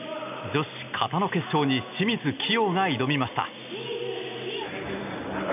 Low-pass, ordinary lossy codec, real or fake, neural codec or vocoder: 3.6 kHz; none; real; none